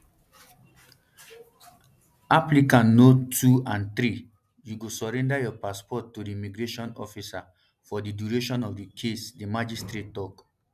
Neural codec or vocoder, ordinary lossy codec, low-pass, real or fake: none; none; 14.4 kHz; real